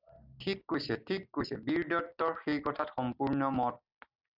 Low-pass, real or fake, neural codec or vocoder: 5.4 kHz; real; none